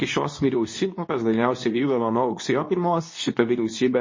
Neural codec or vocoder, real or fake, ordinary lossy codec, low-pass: codec, 24 kHz, 0.9 kbps, WavTokenizer, medium speech release version 2; fake; MP3, 32 kbps; 7.2 kHz